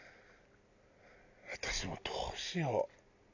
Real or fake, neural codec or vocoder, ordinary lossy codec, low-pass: real; none; none; 7.2 kHz